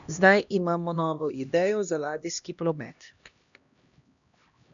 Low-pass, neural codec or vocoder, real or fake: 7.2 kHz; codec, 16 kHz, 1 kbps, X-Codec, HuBERT features, trained on LibriSpeech; fake